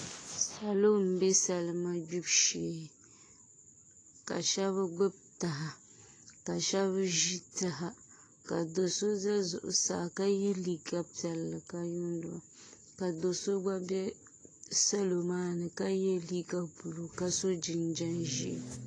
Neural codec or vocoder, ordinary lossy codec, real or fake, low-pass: none; AAC, 32 kbps; real; 9.9 kHz